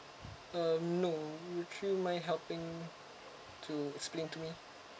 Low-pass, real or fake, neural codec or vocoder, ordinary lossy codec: none; real; none; none